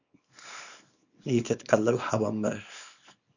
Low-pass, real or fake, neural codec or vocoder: 7.2 kHz; fake; codec, 24 kHz, 0.9 kbps, WavTokenizer, small release